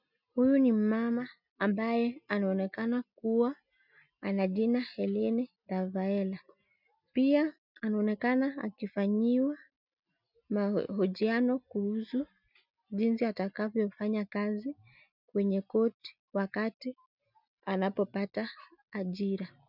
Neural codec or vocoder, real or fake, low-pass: none; real; 5.4 kHz